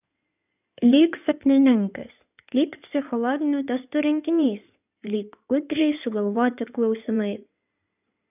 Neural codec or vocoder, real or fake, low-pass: codec, 16 kHz in and 24 kHz out, 2.2 kbps, FireRedTTS-2 codec; fake; 3.6 kHz